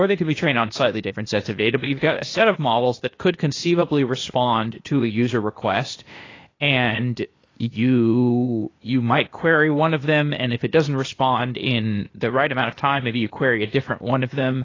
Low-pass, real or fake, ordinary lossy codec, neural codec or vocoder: 7.2 kHz; fake; AAC, 32 kbps; codec, 16 kHz, 0.8 kbps, ZipCodec